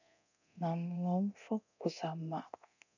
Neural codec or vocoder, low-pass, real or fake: codec, 24 kHz, 0.9 kbps, DualCodec; 7.2 kHz; fake